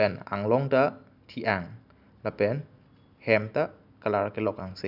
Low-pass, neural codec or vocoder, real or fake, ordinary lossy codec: 5.4 kHz; none; real; none